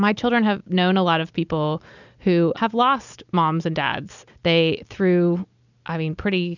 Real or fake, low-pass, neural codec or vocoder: real; 7.2 kHz; none